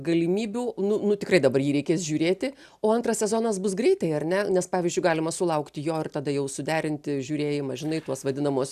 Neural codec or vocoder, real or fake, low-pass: none; real; 14.4 kHz